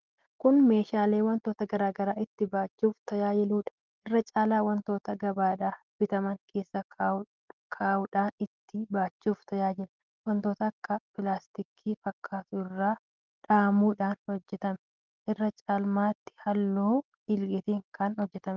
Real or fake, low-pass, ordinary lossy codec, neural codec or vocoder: real; 7.2 kHz; Opus, 32 kbps; none